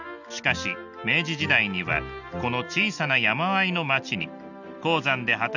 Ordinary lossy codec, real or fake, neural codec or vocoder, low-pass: none; real; none; 7.2 kHz